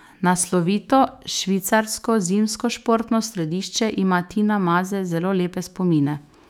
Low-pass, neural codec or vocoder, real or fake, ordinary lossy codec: 19.8 kHz; autoencoder, 48 kHz, 128 numbers a frame, DAC-VAE, trained on Japanese speech; fake; none